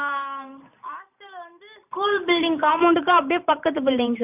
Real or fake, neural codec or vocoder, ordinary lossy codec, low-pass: fake; vocoder, 44.1 kHz, 128 mel bands every 512 samples, BigVGAN v2; none; 3.6 kHz